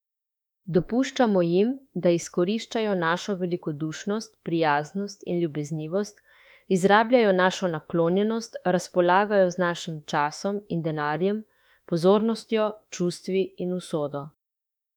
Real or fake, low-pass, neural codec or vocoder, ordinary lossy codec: fake; 19.8 kHz; autoencoder, 48 kHz, 32 numbers a frame, DAC-VAE, trained on Japanese speech; none